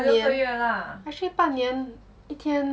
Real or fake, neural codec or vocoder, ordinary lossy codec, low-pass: real; none; none; none